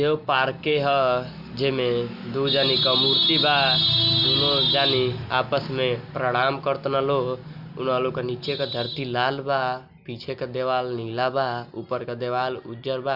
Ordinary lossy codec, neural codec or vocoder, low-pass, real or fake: Opus, 64 kbps; none; 5.4 kHz; real